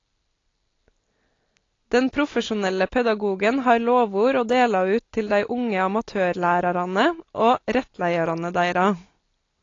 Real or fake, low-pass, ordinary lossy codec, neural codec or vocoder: real; 7.2 kHz; AAC, 32 kbps; none